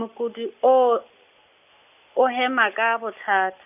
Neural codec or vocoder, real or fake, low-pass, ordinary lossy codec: none; real; 3.6 kHz; none